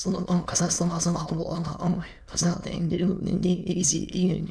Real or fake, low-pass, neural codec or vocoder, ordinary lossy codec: fake; none; autoencoder, 22.05 kHz, a latent of 192 numbers a frame, VITS, trained on many speakers; none